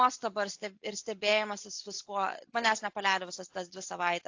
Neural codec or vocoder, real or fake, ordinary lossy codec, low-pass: none; real; AAC, 48 kbps; 7.2 kHz